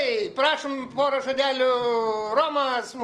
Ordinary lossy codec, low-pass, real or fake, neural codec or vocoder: Opus, 24 kbps; 10.8 kHz; real; none